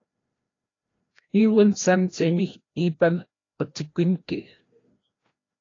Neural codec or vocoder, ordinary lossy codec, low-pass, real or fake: codec, 16 kHz, 1 kbps, FreqCodec, larger model; AAC, 32 kbps; 7.2 kHz; fake